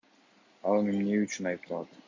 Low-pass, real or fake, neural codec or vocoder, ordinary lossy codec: 7.2 kHz; real; none; MP3, 48 kbps